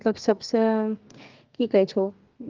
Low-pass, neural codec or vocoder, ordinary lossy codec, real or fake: 7.2 kHz; codec, 32 kHz, 1.9 kbps, SNAC; Opus, 32 kbps; fake